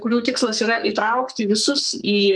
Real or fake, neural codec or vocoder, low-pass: fake; codec, 44.1 kHz, 2.6 kbps, SNAC; 9.9 kHz